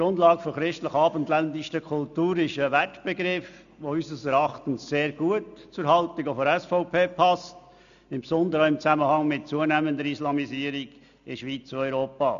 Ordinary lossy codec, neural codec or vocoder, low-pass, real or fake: none; none; 7.2 kHz; real